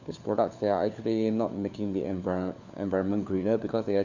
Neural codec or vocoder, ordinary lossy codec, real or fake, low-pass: codec, 16 kHz, 4 kbps, FunCodec, trained on LibriTTS, 50 frames a second; none; fake; 7.2 kHz